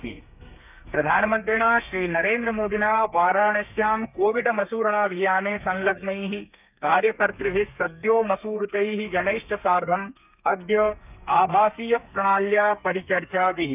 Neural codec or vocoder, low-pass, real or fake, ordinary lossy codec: codec, 32 kHz, 1.9 kbps, SNAC; 3.6 kHz; fake; none